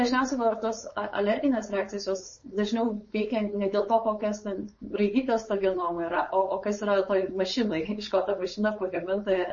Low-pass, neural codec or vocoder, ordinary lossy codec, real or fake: 7.2 kHz; codec, 16 kHz, 4.8 kbps, FACodec; MP3, 32 kbps; fake